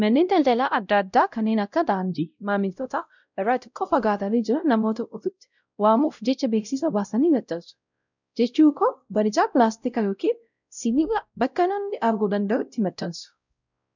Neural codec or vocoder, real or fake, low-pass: codec, 16 kHz, 0.5 kbps, X-Codec, WavLM features, trained on Multilingual LibriSpeech; fake; 7.2 kHz